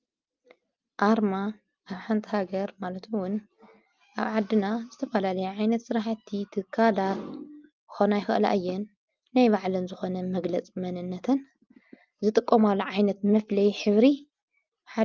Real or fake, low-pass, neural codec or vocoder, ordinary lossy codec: real; 7.2 kHz; none; Opus, 24 kbps